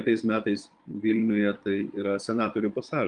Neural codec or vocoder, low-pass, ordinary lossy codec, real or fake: vocoder, 22.05 kHz, 80 mel bands, Vocos; 9.9 kHz; Opus, 32 kbps; fake